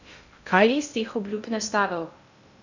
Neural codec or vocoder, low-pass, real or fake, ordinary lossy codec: codec, 16 kHz in and 24 kHz out, 0.6 kbps, FocalCodec, streaming, 2048 codes; 7.2 kHz; fake; none